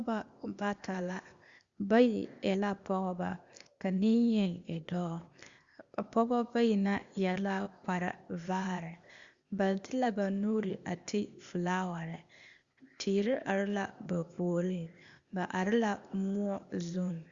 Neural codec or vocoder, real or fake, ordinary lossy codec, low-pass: codec, 16 kHz, 0.8 kbps, ZipCodec; fake; Opus, 64 kbps; 7.2 kHz